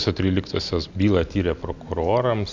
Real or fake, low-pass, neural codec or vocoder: real; 7.2 kHz; none